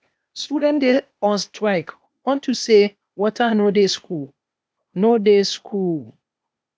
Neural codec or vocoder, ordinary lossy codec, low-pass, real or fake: codec, 16 kHz, 0.8 kbps, ZipCodec; none; none; fake